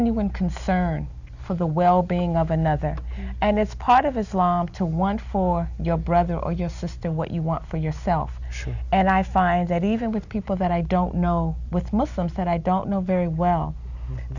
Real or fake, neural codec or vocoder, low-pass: real; none; 7.2 kHz